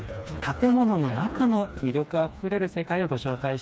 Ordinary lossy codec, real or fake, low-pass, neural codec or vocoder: none; fake; none; codec, 16 kHz, 2 kbps, FreqCodec, smaller model